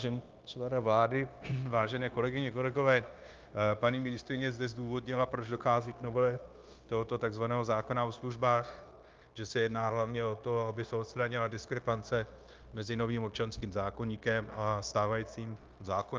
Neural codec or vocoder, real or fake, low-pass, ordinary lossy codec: codec, 16 kHz, 0.9 kbps, LongCat-Audio-Codec; fake; 7.2 kHz; Opus, 32 kbps